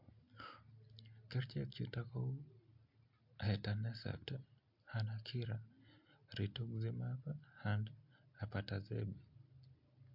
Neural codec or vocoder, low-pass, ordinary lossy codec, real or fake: none; 5.4 kHz; MP3, 48 kbps; real